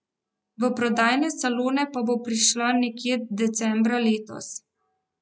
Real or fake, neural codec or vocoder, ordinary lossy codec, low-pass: real; none; none; none